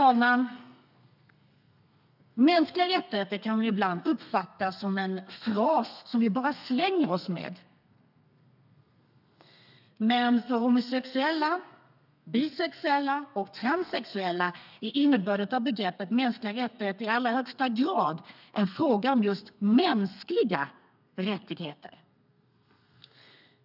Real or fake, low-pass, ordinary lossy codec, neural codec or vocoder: fake; 5.4 kHz; none; codec, 32 kHz, 1.9 kbps, SNAC